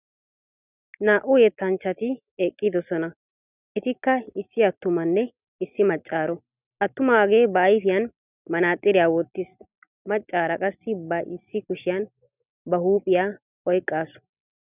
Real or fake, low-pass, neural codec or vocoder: real; 3.6 kHz; none